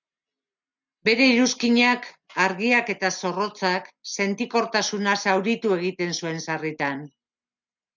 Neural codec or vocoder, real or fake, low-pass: none; real; 7.2 kHz